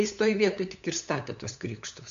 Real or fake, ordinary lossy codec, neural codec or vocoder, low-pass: fake; MP3, 64 kbps; codec, 16 kHz, 8 kbps, FunCodec, trained on LibriTTS, 25 frames a second; 7.2 kHz